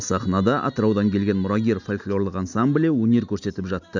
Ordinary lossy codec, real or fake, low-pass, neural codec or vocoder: none; real; 7.2 kHz; none